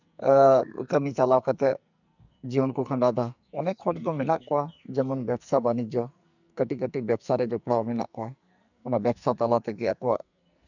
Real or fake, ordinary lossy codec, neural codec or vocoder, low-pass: fake; none; codec, 44.1 kHz, 2.6 kbps, SNAC; 7.2 kHz